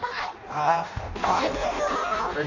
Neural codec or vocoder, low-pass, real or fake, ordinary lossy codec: codec, 16 kHz in and 24 kHz out, 0.6 kbps, FireRedTTS-2 codec; 7.2 kHz; fake; Opus, 64 kbps